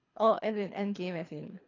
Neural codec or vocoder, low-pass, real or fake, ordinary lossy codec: codec, 24 kHz, 3 kbps, HILCodec; 7.2 kHz; fake; AAC, 32 kbps